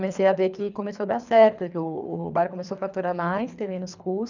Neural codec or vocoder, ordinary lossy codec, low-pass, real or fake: codec, 24 kHz, 3 kbps, HILCodec; none; 7.2 kHz; fake